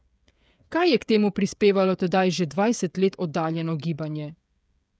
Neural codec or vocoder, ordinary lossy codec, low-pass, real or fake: codec, 16 kHz, 16 kbps, FreqCodec, smaller model; none; none; fake